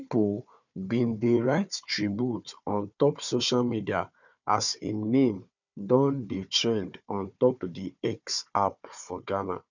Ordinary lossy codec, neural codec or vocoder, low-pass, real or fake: none; codec, 16 kHz, 4 kbps, FunCodec, trained on Chinese and English, 50 frames a second; 7.2 kHz; fake